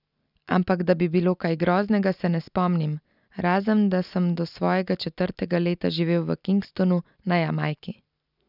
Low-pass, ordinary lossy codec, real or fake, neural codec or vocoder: 5.4 kHz; none; real; none